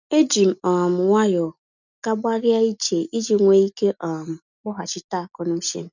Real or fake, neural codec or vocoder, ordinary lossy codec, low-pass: real; none; none; 7.2 kHz